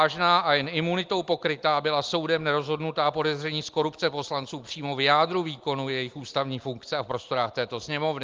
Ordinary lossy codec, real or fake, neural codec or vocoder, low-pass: Opus, 32 kbps; real; none; 7.2 kHz